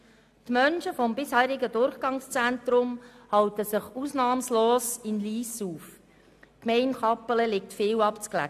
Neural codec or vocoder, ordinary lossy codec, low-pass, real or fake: none; AAC, 96 kbps; 14.4 kHz; real